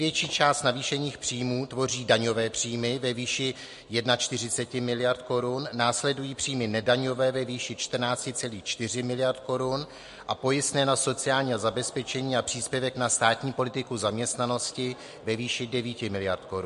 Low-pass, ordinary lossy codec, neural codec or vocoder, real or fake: 14.4 kHz; MP3, 48 kbps; none; real